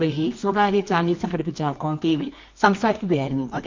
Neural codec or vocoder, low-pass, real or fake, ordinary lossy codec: codec, 24 kHz, 0.9 kbps, WavTokenizer, medium music audio release; 7.2 kHz; fake; MP3, 48 kbps